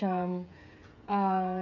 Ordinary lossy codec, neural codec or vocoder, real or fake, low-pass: none; codec, 16 kHz, 8 kbps, FreqCodec, smaller model; fake; 7.2 kHz